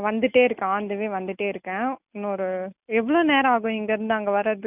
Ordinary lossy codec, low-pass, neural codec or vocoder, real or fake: none; 3.6 kHz; none; real